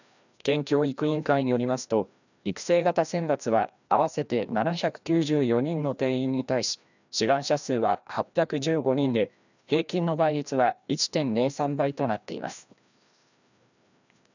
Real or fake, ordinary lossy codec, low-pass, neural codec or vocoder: fake; none; 7.2 kHz; codec, 16 kHz, 1 kbps, FreqCodec, larger model